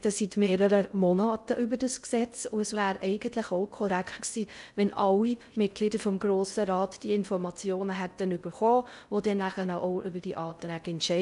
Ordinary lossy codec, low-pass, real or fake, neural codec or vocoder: none; 10.8 kHz; fake; codec, 16 kHz in and 24 kHz out, 0.6 kbps, FocalCodec, streaming, 2048 codes